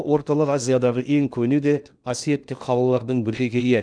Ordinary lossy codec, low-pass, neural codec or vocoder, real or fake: none; 9.9 kHz; codec, 16 kHz in and 24 kHz out, 0.8 kbps, FocalCodec, streaming, 65536 codes; fake